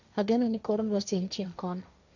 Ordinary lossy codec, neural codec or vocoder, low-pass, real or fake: none; codec, 16 kHz, 1.1 kbps, Voila-Tokenizer; 7.2 kHz; fake